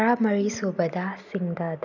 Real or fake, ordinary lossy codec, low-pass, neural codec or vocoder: real; none; 7.2 kHz; none